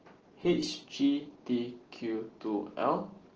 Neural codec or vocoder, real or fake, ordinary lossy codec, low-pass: none; real; Opus, 16 kbps; 7.2 kHz